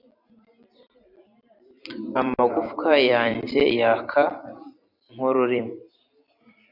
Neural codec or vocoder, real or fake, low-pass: vocoder, 44.1 kHz, 128 mel bands every 256 samples, BigVGAN v2; fake; 5.4 kHz